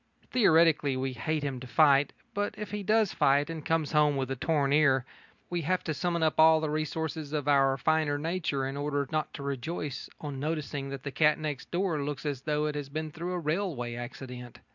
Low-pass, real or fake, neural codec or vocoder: 7.2 kHz; real; none